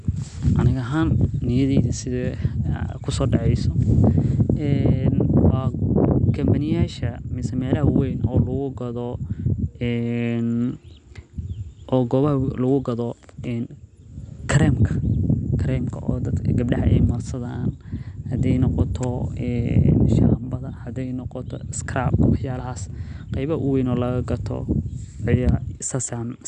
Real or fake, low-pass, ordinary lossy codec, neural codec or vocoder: real; 9.9 kHz; none; none